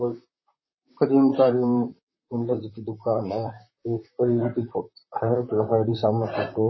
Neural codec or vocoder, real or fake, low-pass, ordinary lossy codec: vocoder, 44.1 kHz, 128 mel bands, Pupu-Vocoder; fake; 7.2 kHz; MP3, 24 kbps